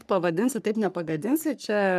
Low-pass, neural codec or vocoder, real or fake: 14.4 kHz; codec, 44.1 kHz, 3.4 kbps, Pupu-Codec; fake